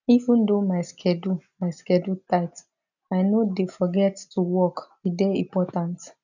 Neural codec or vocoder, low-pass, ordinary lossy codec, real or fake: none; 7.2 kHz; none; real